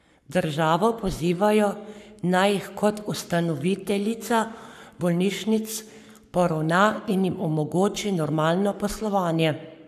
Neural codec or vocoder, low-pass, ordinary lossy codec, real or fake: codec, 44.1 kHz, 7.8 kbps, Pupu-Codec; 14.4 kHz; none; fake